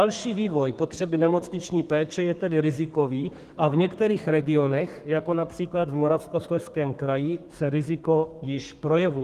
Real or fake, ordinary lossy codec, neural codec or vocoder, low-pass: fake; Opus, 24 kbps; codec, 32 kHz, 1.9 kbps, SNAC; 14.4 kHz